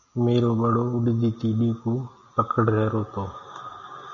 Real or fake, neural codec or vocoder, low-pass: real; none; 7.2 kHz